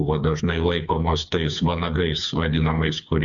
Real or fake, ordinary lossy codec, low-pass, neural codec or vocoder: fake; MP3, 64 kbps; 7.2 kHz; codec, 16 kHz, 4 kbps, FreqCodec, smaller model